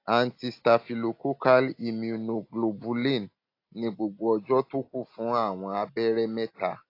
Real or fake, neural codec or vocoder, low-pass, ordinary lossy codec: real; none; 5.4 kHz; AAC, 32 kbps